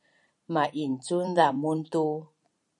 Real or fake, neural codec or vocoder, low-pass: fake; vocoder, 44.1 kHz, 128 mel bands every 512 samples, BigVGAN v2; 10.8 kHz